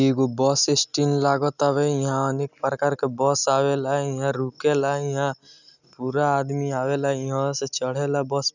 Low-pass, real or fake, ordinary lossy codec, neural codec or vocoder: 7.2 kHz; real; none; none